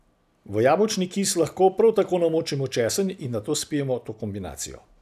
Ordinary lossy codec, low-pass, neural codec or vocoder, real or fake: none; 14.4 kHz; none; real